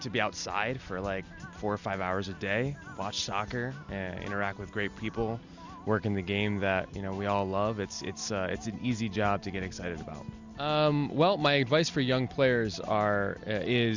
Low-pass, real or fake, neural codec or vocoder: 7.2 kHz; real; none